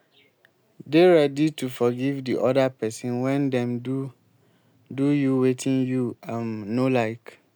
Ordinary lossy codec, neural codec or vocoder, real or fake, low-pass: none; none; real; none